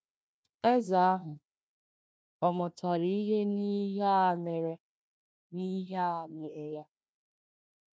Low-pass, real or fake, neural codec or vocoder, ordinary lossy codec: none; fake; codec, 16 kHz, 1 kbps, FunCodec, trained on Chinese and English, 50 frames a second; none